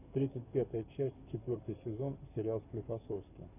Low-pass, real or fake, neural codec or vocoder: 3.6 kHz; fake; codec, 44.1 kHz, 7.8 kbps, Pupu-Codec